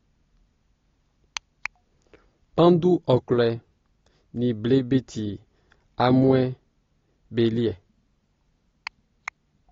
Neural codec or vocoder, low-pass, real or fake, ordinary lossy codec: none; 7.2 kHz; real; AAC, 32 kbps